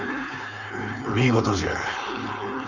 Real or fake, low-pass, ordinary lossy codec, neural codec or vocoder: fake; 7.2 kHz; none; codec, 16 kHz, 4.8 kbps, FACodec